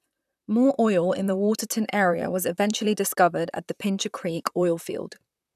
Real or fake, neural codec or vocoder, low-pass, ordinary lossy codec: fake; vocoder, 44.1 kHz, 128 mel bands, Pupu-Vocoder; 14.4 kHz; none